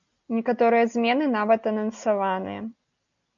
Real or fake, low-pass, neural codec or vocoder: real; 7.2 kHz; none